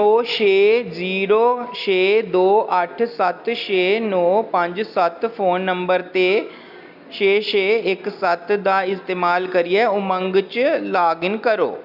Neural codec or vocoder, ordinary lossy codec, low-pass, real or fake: none; none; 5.4 kHz; real